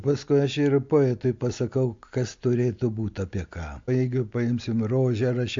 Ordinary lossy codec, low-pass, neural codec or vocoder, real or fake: MP3, 48 kbps; 7.2 kHz; none; real